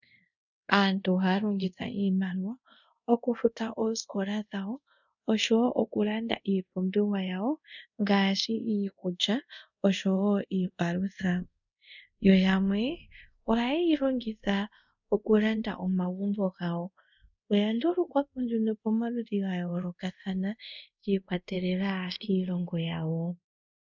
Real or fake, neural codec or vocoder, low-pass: fake; codec, 24 kHz, 0.5 kbps, DualCodec; 7.2 kHz